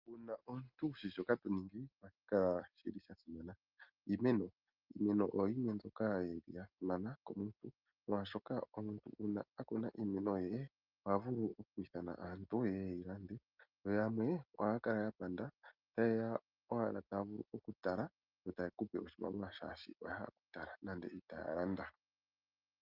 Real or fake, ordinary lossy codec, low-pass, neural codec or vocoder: real; Opus, 24 kbps; 5.4 kHz; none